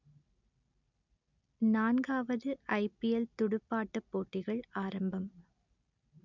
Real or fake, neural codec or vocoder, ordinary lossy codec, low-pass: real; none; none; 7.2 kHz